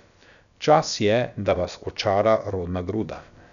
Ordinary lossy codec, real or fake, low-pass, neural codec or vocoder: none; fake; 7.2 kHz; codec, 16 kHz, about 1 kbps, DyCAST, with the encoder's durations